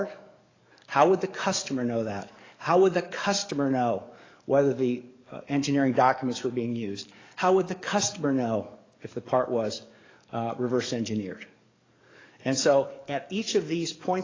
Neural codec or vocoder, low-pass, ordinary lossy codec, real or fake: codec, 44.1 kHz, 7.8 kbps, DAC; 7.2 kHz; AAC, 32 kbps; fake